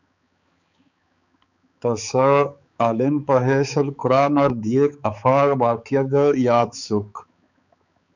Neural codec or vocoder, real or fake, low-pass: codec, 16 kHz, 4 kbps, X-Codec, HuBERT features, trained on balanced general audio; fake; 7.2 kHz